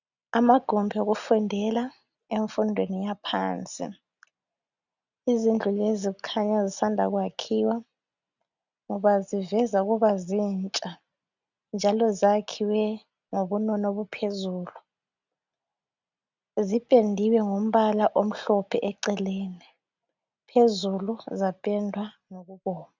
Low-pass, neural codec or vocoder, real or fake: 7.2 kHz; none; real